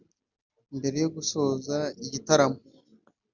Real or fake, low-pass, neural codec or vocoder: real; 7.2 kHz; none